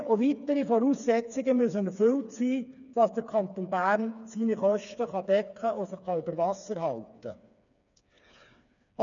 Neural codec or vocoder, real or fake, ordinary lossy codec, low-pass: codec, 16 kHz, 4 kbps, FreqCodec, smaller model; fake; none; 7.2 kHz